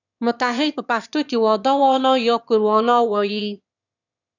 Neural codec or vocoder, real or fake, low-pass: autoencoder, 22.05 kHz, a latent of 192 numbers a frame, VITS, trained on one speaker; fake; 7.2 kHz